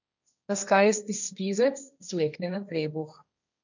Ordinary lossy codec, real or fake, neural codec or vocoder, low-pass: none; fake; codec, 16 kHz, 1.1 kbps, Voila-Tokenizer; 7.2 kHz